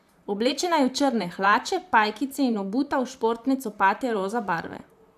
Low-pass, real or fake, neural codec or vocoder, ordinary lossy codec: 14.4 kHz; fake; vocoder, 44.1 kHz, 128 mel bands, Pupu-Vocoder; AAC, 96 kbps